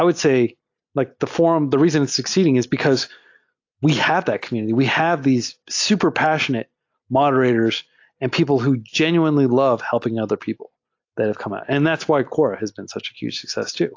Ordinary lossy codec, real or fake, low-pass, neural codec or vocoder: AAC, 48 kbps; real; 7.2 kHz; none